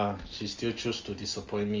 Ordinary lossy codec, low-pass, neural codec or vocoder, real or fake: Opus, 32 kbps; 7.2 kHz; none; real